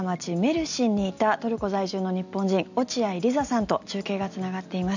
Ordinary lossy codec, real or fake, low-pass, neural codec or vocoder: none; real; 7.2 kHz; none